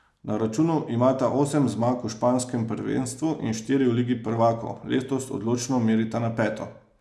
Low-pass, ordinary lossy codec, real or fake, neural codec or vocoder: none; none; real; none